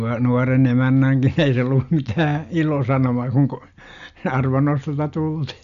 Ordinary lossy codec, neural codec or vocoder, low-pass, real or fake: none; none; 7.2 kHz; real